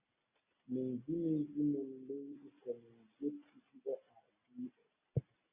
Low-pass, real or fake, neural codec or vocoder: 3.6 kHz; real; none